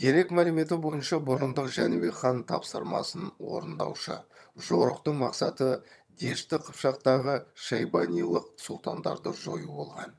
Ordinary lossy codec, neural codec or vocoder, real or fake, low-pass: none; vocoder, 22.05 kHz, 80 mel bands, HiFi-GAN; fake; none